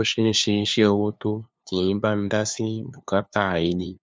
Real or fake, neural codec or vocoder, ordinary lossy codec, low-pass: fake; codec, 16 kHz, 2 kbps, FunCodec, trained on LibriTTS, 25 frames a second; none; none